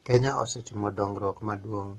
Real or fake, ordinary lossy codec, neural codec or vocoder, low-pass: fake; AAC, 32 kbps; codec, 44.1 kHz, 7.8 kbps, Pupu-Codec; 19.8 kHz